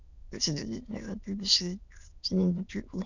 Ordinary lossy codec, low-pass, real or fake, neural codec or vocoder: none; 7.2 kHz; fake; autoencoder, 22.05 kHz, a latent of 192 numbers a frame, VITS, trained on many speakers